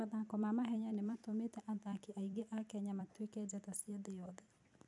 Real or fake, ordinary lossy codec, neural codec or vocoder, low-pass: fake; none; vocoder, 44.1 kHz, 128 mel bands every 256 samples, BigVGAN v2; 10.8 kHz